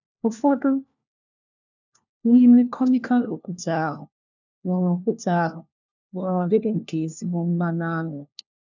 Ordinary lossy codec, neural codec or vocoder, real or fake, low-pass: none; codec, 16 kHz, 1 kbps, FunCodec, trained on LibriTTS, 50 frames a second; fake; 7.2 kHz